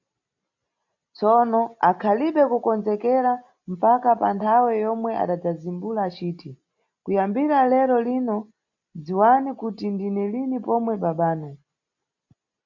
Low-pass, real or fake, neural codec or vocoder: 7.2 kHz; real; none